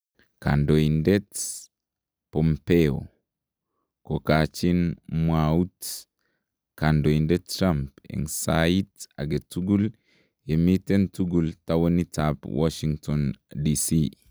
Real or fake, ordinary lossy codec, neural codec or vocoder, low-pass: real; none; none; none